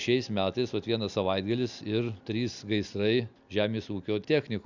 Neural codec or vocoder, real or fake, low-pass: none; real; 7.2 kHz